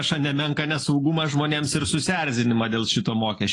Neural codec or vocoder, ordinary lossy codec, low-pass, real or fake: none; AAC, 32 kbps; 10.8 kHz; real